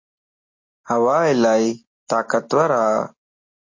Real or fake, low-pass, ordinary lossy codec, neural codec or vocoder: real; 7.2 kHz; MP3, 32 kbps; none